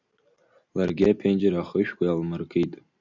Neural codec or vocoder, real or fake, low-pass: none; real; 7.2 kHz